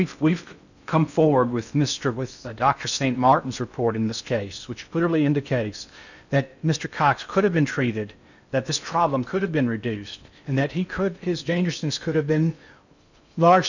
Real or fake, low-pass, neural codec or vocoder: fake; 7.2 kHz; codec, 16 kHz in and 24 kHz out, 0.6 kbps, FocalCodec, streaming, 2048 codes